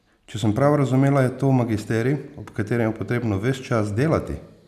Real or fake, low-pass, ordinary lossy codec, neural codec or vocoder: real; 14.4 kHz; none; none